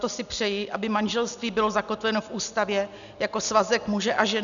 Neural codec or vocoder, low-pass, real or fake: none; 7.2 kHz; real